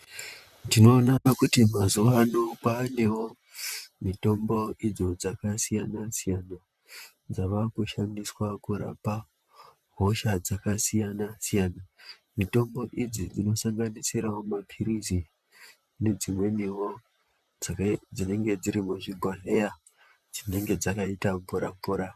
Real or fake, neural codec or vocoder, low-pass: fake; vocoder, 44.1 kHz, 128 mel bands, Pupu-Vocoder; 14.4 kHz